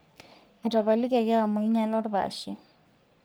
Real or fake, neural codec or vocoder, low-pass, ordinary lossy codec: fake; codec, 44.1 kHz, 3.4 kbps, Pupu-Codec; none; none